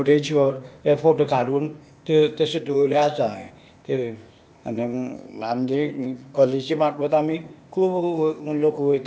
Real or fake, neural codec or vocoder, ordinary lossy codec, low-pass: fake; codec, 16 kHz, 0.8 kbps, ZipCodec; none; none